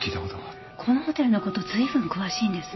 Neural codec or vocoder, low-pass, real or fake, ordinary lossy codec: none; 7.2 kHz; real; MP3, 24 kbps